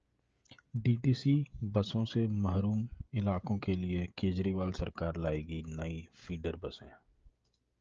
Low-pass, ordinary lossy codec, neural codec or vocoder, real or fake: 7.2 kHz; Opus, 24 kbps; codec, 16 kHz, 16 kbps, FreqCodec, smaller model; fake